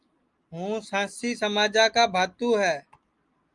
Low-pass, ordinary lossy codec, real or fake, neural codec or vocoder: 10.8 kHz; Opus, 32 kbps; real; none